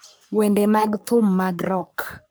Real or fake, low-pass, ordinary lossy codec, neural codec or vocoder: fake; none; none; codec, 44.1 kHz, 3.4 kbps, Pupu-Codec